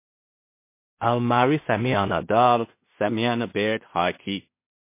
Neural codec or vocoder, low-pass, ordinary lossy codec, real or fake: codec, 16 kHz in and 24 kHz out, 0.4 kbps, LongCat-Audio-Codec, two codebook decoder; 3.6 kHz; MP3, 24 kbps; fake